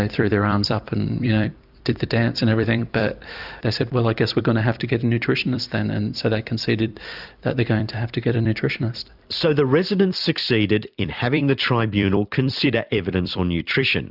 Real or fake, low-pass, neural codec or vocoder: fake; 5.4 kHz; vocoder, 44.1 kHz, 128 mel bands every 256 samples, BigVGAN v2